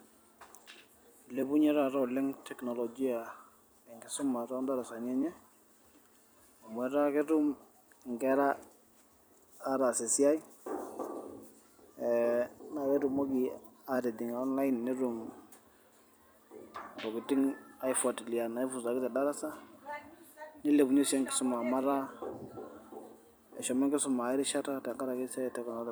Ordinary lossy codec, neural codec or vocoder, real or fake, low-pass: none; vocoder, 44.1 kHz, 128 mel bands every 256 samples, BigVGAN v2; fake; none